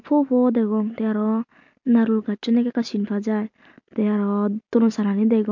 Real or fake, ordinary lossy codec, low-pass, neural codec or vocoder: real; MP3, 48 kbps; 7.2 kHz; none